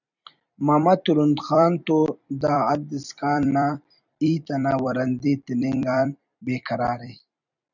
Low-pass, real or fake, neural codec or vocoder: 7.2 kHz; fake; vocoder, 44.1 kHz, 128 mel bands every 256 samples, BigVGAN v2